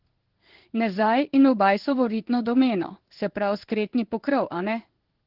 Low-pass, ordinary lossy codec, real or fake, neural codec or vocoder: 5.4 kHz; Opus, 16 kbps; fake; codec, 16 kHz in and 24 kHz out, 1 kbps, XY-Tokenizer